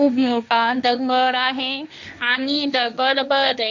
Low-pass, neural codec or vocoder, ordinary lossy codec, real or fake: 7.2 kHz; codec, 16 kHz, 1.1 kbps, Voila-Tokenizer; none; fake